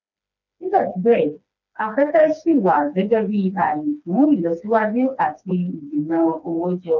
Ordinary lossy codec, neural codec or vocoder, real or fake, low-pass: none; codec, 16 kHz, 2 kbps, FreqCodec, smaller model; fake; 7.2 kHz